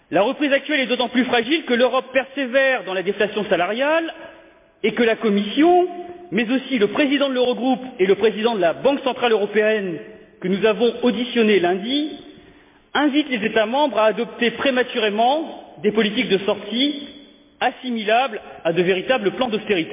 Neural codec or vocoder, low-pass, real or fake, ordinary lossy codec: none; 3.6 kHz; real; MP3, 24 kbps